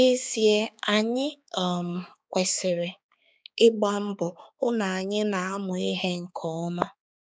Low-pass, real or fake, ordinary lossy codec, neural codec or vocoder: none; fake; none; codec, 16 kHz, 4 kbps, X-Codec, HuBERT features, trained on balanced general audio